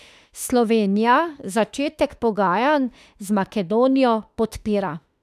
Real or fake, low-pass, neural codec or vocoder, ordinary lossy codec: fake; 14.4 kHz; autoencoder, 48 kHz, 32 numbers a frame, DAC-VAE, trained on Japanese speech; none